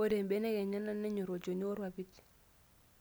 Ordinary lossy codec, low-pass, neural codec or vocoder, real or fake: none; none; none; real